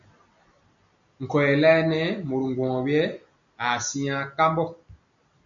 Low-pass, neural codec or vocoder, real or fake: 7.2 kHz; none; real